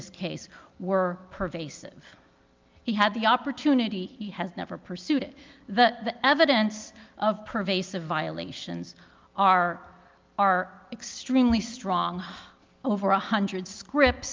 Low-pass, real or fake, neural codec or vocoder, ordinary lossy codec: 7.2 kHz; real; none; Opus, 24 kbps